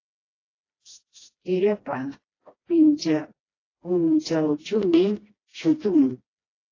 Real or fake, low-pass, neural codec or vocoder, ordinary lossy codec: fake; 7.2 kHz; codec, 16 kHz, 1 kbps, FreqCodec, smaller model; AAC, 32 kbps